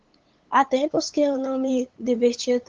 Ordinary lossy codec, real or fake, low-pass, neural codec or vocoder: Opus, 16 kbps; fake; 7.2 kHz; codec, 16 kHz, 8 kbps, FunCodec, trained on LibriTTS, 25 frames a second